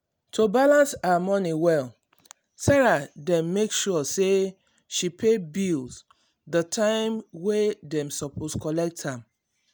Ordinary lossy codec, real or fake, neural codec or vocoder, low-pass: none; real; none; none